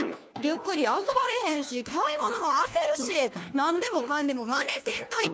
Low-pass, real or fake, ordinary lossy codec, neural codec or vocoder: none; fake; none; codec, 16 kHz, 1 kbps, FunCodec, trained on LibriTTS, 50 frames a second